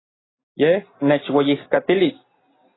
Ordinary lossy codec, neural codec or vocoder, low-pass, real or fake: AAC, 16 kbps; none; 7.2 kHz; real